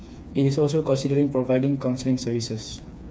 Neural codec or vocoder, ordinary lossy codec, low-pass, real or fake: codec, 16 kHz, 4 kbps, FreqCodec, smaller model; none; none; fake